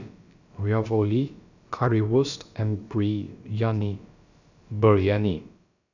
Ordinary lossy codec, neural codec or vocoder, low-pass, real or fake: none; codec, 16 kHz, about 1 kbps, DyCAST, with the encoder's durations; 7.2 kHz; fake